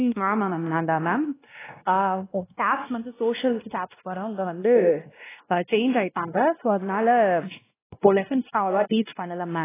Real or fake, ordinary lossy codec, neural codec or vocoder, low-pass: fake; AAC, 16 kbps; codec, 16 kHz, 1 kbps, X-Codec, WavLM features, trained on Multilingual LibriSpeech; 3.6 kHz